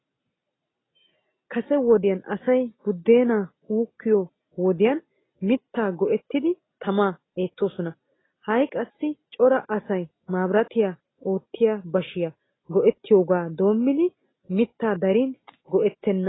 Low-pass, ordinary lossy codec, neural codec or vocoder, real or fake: 7.2 kHz; AAC, 16 kbps; none; real